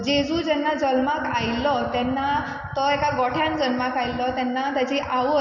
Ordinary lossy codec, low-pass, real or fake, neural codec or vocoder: none; 7.2 kHz; real; none